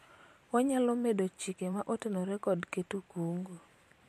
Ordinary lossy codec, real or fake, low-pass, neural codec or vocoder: MP3, 64 kbps; fake; 14.4 kHz; vocoder, 44.1 kHz, 128 mel bands every 512 samples, BigVGAN v2